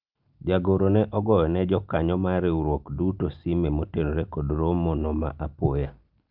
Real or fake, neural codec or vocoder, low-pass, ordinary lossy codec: real; none; 5.4 kHz; Opus, 24 kbps